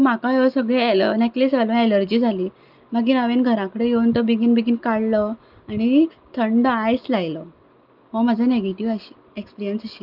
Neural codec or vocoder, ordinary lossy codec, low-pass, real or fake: none; Opus, 24 kbps; 5.4 kHz; real